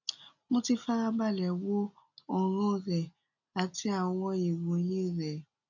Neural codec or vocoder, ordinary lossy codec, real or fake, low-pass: none; none; real; 7.2 kHz